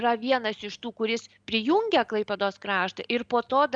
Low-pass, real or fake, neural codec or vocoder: 9.9 kHz; real; none